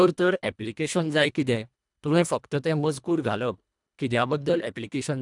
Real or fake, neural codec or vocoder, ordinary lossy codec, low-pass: fake; codec, 24 kHz, 1.5 kbps, HILCodec; none; 10.8 kHz